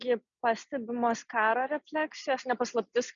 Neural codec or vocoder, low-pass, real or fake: none; 7.2 kHz; real